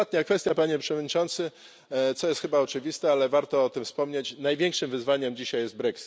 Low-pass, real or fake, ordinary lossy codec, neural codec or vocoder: none; real; none; none